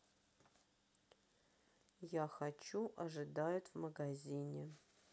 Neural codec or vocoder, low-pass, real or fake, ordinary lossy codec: none; none; real; none